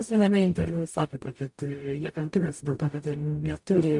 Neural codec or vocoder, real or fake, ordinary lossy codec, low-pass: codec, 44.1 kHz, 0.9 kbps, DAC; fake; MP3, 64 kbps; 10.8 kHz